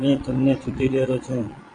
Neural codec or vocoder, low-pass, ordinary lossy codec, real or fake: vocoder, 22.05 kHz, 80 mel bands, Vocos; 9.9 kHz; AAC, 48 kbps; fake